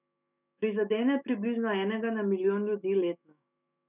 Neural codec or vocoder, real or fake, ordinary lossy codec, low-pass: autoencoder, 48 kHz, 128 numbers a frame, DAC-VAE, trained on Japanese speech; fake; none; 3.6 kHz